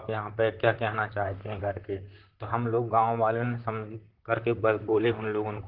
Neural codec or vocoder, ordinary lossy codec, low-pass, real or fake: vocoder, 44.1 kHz, 128 mel bands, Pupu-Vocoder; Opus, 16 kbps; 5.4 kHz; fake